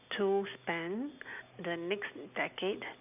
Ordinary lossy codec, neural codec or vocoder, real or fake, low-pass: none; none; real; 3.6 kHz